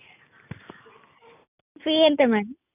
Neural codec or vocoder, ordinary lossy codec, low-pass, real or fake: none; none; 3.6 kHz; real